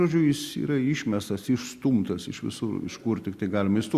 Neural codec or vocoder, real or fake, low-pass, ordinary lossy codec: none; real; 14.4 kHz; Opus, 64 kbps